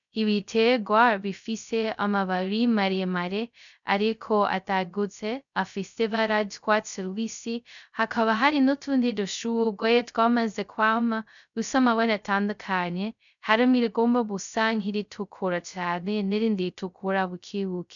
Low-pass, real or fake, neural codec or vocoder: 7.2 kHz; fake; codec, 16 kHz, 0.2 kbps, FocalCodec